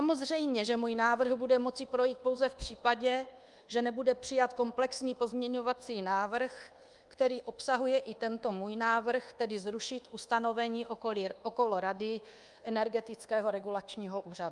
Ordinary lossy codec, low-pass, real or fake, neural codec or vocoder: Opus, 32 kbps; 10.8 kHz; fake; codec, 24 kHz, 1.2 kbps, DualCodec